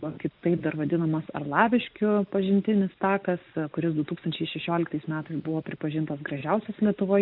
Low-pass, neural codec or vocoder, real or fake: 5.4 kHz; none; real